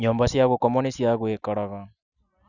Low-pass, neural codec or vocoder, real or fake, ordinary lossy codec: 7.2 kHz; none; real; none